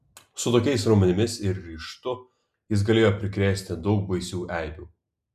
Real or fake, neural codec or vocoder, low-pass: real; none; 14.4 kHz